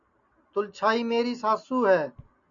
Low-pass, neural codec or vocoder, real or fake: 7.2 kHz; none; real